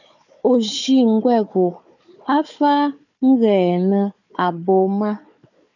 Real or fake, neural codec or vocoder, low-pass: fake; codec, 16 kHz, 4 kbps, FunCodec, trained on Chinese and English, 50 frames a second; 7.2 kHz